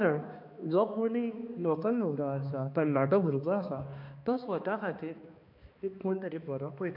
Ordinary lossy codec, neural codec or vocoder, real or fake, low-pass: MP3, 48 kbps; codec, 16 kHz, 2 kbps, X-Codec, HuBERT features, trained on balanced general audio; fake; 5.4 kHz